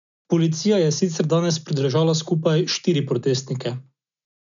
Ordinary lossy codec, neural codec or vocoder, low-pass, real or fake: none; none; 7.2 kHz; real